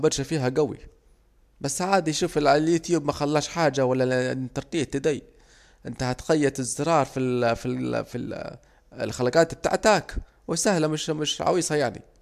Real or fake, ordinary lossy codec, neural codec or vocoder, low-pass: real; MP3, 96 kbps; none; 14.4 kHz